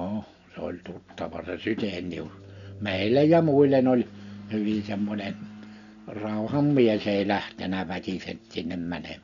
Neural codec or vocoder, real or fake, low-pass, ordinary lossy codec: none; real; 7.2 kHz; none